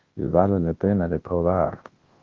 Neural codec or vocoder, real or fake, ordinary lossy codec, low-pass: codec, 24 kHz, 0.9 kbps, WavTokenizer, large speech release; fake; Opus, 16 kbps; 7.2 kHz